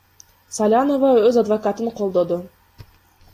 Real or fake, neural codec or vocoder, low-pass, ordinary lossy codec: real; none; 14.4 kHz; AAC, 48 kbps